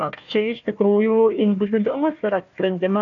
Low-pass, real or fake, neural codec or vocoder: 7.2 kHz; fake; codec, 16 kHz, 1 kbps, FunCodec, trained on Chinese and English, 50 frames a second